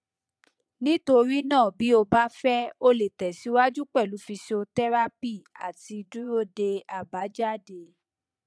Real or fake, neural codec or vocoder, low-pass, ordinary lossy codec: fake; vocoder, 22.05 kHz, 80 mel bands, Vocos; none; none